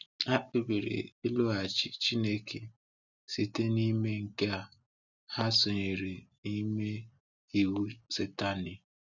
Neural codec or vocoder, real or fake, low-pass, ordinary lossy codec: none; real; 7.2 kHz; none